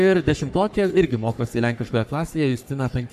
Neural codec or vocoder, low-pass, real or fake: codec, 44.1 kHz, 3.4 kbps, Pupu-Codec; 14.4 kHz; fake